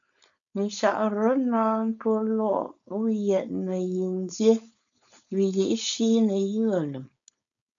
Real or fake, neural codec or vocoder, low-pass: fake; codec, 16 kHz, 4.8 kbps, FACodec; 7.2 kHz